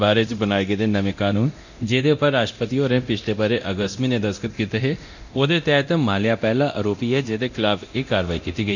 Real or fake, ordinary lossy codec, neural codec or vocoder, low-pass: fake; none; codec, 24 kHz, 0.9 kbps, DualCodec; 7.2 kHz